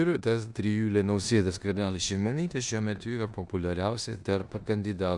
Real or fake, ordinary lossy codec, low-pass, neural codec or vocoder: fake; Opus, 64 kbps; 10.8 kHz; codec, 16 kHz in and 24 kHz out, 0.9 kbps, LongCat-Audio-Codec, four codebook decoder